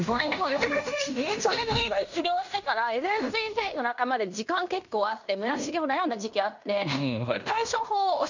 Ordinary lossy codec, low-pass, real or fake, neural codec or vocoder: none; 7.2 kHz; fake; codec, 16 kHz in and 24 kHz out, 0.9 kbps, LongCat-Audio-Codec, fine tuned four codebook decoder